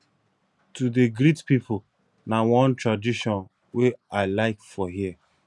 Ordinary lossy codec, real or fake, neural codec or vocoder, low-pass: none; real; none; none